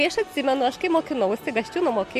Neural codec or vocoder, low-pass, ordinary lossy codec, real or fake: none; 14.4 kHz; MP3, 64 kbps; real